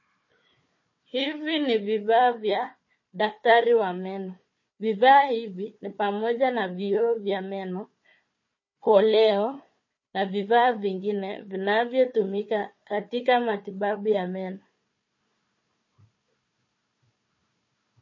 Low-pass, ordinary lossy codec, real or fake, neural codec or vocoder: 7.2 kHz; MP3, 32 kbps; fake; codec, 16 kHz, 4 kbps, FunCodec, trained on Chinese and English, 50 frames a second